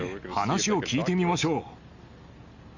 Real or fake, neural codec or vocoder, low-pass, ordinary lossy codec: real; none; 7.2 kHz; none